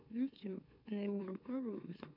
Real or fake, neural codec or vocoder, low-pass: fake; autoencoder, 44.1 kHz, a latent of 192 numbers a frame, MeloTTS; 5.4 kHz